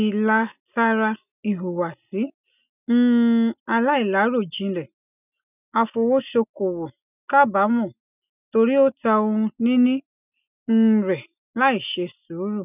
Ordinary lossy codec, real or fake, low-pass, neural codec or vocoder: none; real; 3.6 kHz; none